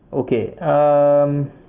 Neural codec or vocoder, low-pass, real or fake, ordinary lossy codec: none; 3.6 kHz; real; Opus, 24 kbps